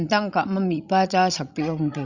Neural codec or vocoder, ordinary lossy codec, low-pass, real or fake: codec, 16 kHz, 16 kbps, FreqCodec, larger model; Opus, 64 kbps; 7.2 kHz; fake